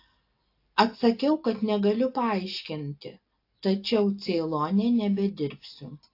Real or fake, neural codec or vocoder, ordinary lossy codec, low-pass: real; none; AAC, 32 kbps; 5.4 kHz